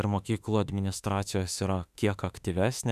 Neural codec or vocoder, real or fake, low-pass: autoencoder, 48 kHz, 32 numbers a frame, DAC-VAE, trained on Japanese speech; fake; 14.4 kHz